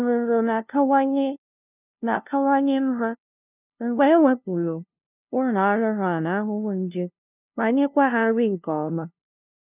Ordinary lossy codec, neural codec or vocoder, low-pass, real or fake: none; codec, 16 kHz, 0.5 kbps, FunCodec, trained on LibriTTS, 25 frames a second; 3.6 kHz; fake